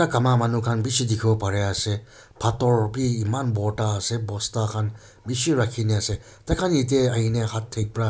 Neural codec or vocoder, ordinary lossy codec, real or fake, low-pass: none; none; real; none